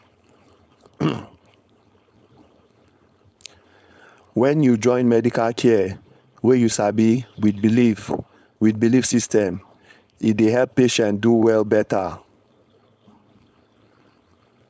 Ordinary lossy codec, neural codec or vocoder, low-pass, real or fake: none; codec, 16 kHz, 4.8 kbps, FACodec; none; fake